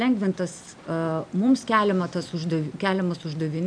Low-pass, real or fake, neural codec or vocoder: 9.9 kHz; real; none